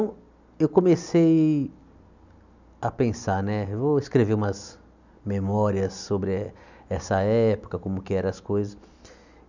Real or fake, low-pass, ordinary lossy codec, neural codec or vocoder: real; 7.2 kHz; none; none